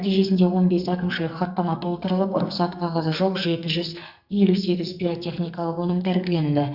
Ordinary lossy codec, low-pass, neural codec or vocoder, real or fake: Opus, 64 kbps; 5.4 kHz; codec, 44.1 kHz, 2.6 kbps, SNAC; fake